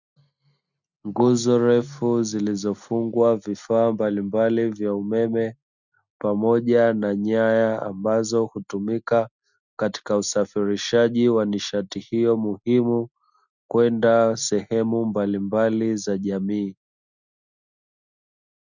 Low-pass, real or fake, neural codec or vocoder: 7.2 kHz; real; none